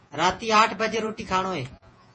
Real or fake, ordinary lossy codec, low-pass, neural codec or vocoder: fake; MP3, 32 kbps; 10.8 kHz; vocoder, 48 kHz, 128 mel bands, Vocos